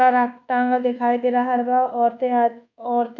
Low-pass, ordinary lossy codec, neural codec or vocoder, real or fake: 7.2 kHz; none; codec, 24 kHz, 1.2 kbps, DualCodec; fake